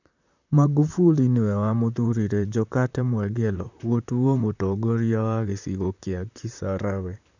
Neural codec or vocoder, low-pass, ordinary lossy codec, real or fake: vocoder, 44.1 kHz, 128 mel bands, Pupu-Vocoder; 7.2 kHz; none; fake